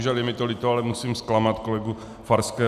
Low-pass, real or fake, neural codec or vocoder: 14.4 kHz; real; none